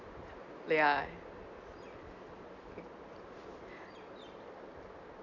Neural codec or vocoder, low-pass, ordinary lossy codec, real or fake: none; 7.2 kHz; none; real